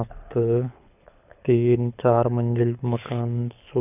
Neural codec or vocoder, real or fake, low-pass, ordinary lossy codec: codec, 24 kHz, 6 kbps, HILCodec; fake; 3.6 kHz; none